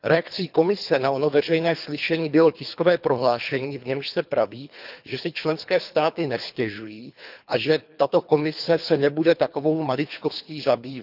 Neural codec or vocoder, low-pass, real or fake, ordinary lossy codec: codec, 24 kHz, 3 kbps, HILCodec; 5.4 kHz; fake; none